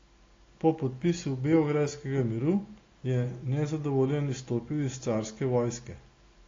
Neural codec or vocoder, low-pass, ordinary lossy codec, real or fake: none; 7.2 kHz; AAC, 32 kbps; real